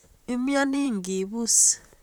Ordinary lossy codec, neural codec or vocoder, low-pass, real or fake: none; vocoder, 44.1 kHz, 128 mel bands, Pupu-Vocoder; 19.8 kHz; fake